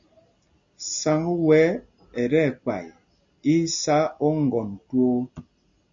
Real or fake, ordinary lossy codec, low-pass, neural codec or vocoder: real; MP3, 64 kbps; 7.2 kHz; none